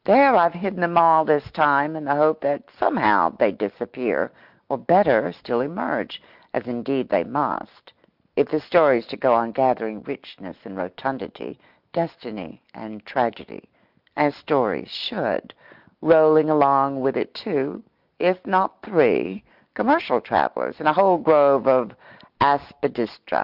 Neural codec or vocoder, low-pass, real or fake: none; 5.4 kHz; real